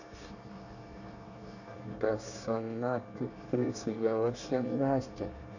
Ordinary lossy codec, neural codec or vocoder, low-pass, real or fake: none; codec, 24 kHz, 1 kbps, SNAC; 7.2 kHz; fake